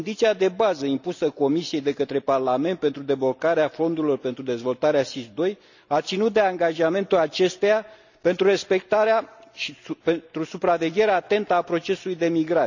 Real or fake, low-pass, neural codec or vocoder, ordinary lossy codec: real; 7.2 kHz; none; none